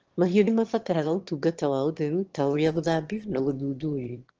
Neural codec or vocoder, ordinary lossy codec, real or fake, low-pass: autoencoder, 22.05 kHz, a latent of 192 numbers a frame, VITS, trained on one speaker; Opus, 16 kbps; fake; 7.2 kHz